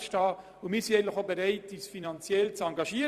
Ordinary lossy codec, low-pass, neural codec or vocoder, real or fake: Opus, 64 kbps; 14.4 kHz; vocoder, 44.1 kHz, 128 mel bands every 256 samples, BigVGAN v2; fake